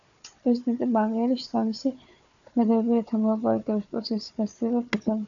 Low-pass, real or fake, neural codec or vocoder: 7.2 kHz; fake; codec, 16 kHz, 4 kbps, FunCodec, trained on Chinese and English, 50 frames a second